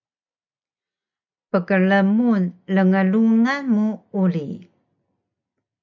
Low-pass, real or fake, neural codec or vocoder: 7.2 kHz; real; none